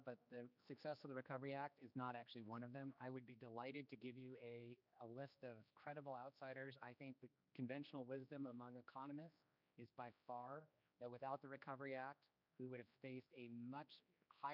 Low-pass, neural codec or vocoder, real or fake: 5.4 kHz; codec, 16 kHz, 2 kbps, X-Codec, HuBERT features, trained on general audio; fake